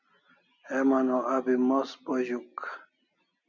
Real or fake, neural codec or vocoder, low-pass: real; none; 7.2 kHz